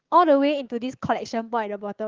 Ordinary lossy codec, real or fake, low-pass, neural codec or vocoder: Opus, 16 kbps; fake; 7.2 kHz; codec, 16 kHz, 6 kbps, DAC